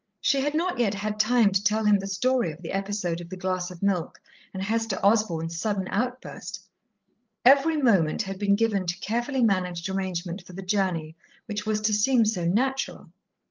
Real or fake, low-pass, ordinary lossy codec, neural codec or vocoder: fake; 7.2 kHz; Opus, 32 kbps; codec, 16 kHz, 16 kbps, FreqCodec, larger model